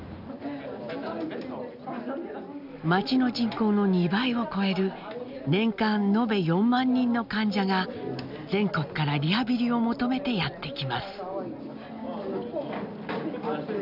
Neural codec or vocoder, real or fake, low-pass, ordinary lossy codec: none; real; 5.4 kHz; none